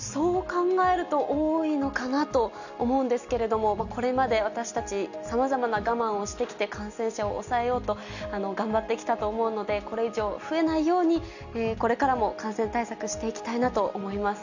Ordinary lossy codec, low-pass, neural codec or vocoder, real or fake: none; 7.2 kHz; none; real